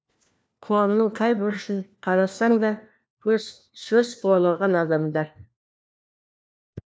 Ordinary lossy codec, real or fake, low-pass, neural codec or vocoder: none; fake; none; codec, 16 kHz, 1 kbps, FunCodec, trained on LibriTTS, 50 frames a second